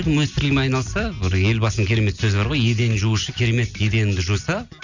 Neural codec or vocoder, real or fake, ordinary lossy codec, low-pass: none; real; none; 7.2 kHz